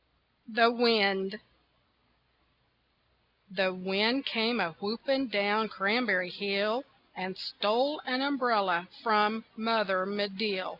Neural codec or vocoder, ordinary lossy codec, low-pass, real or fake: none; Opus, 64 kbps; 5.4 kHz; real